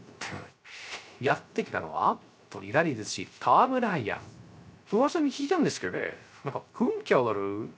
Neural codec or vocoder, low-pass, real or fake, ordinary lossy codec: codec, 16 kHz, 0.3 kbps, FocalCodec; none; fake; none